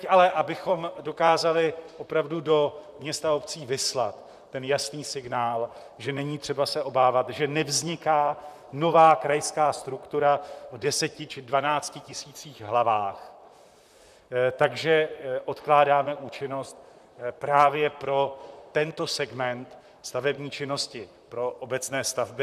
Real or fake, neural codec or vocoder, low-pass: fake; vocoder, 44.1 kHz, 128 mel bands, Pupu-Vocoder; 14.4 kHz